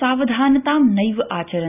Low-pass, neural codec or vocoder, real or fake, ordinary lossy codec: 3.6 kHz; none; real; none